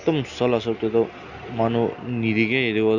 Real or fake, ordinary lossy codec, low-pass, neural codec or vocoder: real; none; 7.2 kHz; none